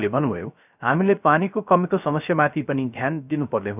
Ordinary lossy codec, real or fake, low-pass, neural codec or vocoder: none; fake; 3.6 kHz; codec, 16 kHz, 0.3 kbps, FocalCodec